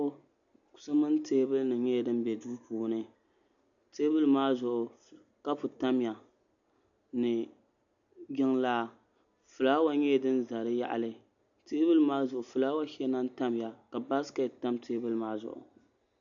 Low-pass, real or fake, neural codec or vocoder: 7.2 kHz; real; none